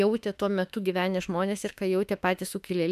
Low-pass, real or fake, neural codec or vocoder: 14.4 kHz; fake; autoencoder, 48 kHz, 32 numbers a frame, DAC-VAE, trained on Japanese speech